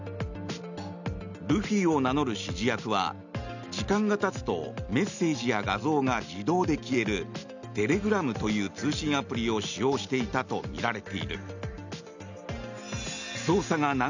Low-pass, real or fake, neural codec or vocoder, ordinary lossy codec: 7.2 kHz; real; none; none